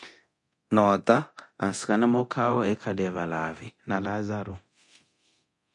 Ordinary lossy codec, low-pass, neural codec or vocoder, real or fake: MP3, 48 kbps; 10.8 kHz; codec, 24 kHz, 0.9 kbps, DualCodec; fake